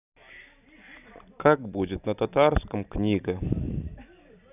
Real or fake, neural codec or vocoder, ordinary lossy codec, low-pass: real; none; none; 3.6 kHz